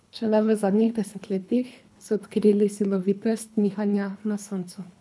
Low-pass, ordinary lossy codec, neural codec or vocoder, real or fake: none; none; codec, 24 kHz, 3 kbps, HILCodec; fake